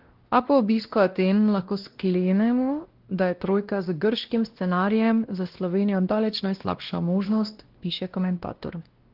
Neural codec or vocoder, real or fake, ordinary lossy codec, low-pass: codec, 16 kHz, 1 kbps, X-Codec, WavLM features, trained on Multilingual LibriSpeech; fake; Opus, 16 kbps; 5.4 kHz